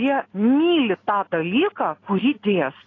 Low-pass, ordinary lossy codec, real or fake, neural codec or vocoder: 7.2 kHz; AAC, 32 kbps; real; none